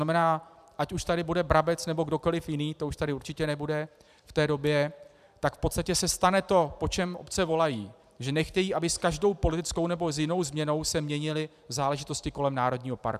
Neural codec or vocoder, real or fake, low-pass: vocoder, 44.1 kHz, 128 mel bands every 512 samples, BigVGAN v2; fake; 14.4 kHz